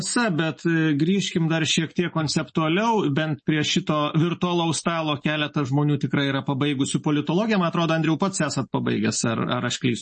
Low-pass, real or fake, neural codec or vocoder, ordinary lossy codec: 9.9 kHz; real; none; MP3, 32 kbps